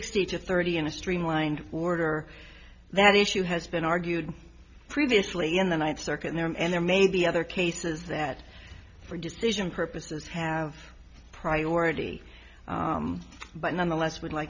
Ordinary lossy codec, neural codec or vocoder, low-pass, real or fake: MP3, 64 kbps; none; 7.2 kHz; real